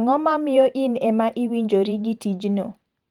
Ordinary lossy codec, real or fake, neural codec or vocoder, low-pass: Opus, 24 kbps; fake; vocoder, 44.1 kHz, 128 mel bands every 512 samples, BigVGAN v2; 19.8 kHz